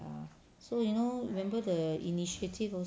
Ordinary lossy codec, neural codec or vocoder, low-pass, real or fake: none; none; none; real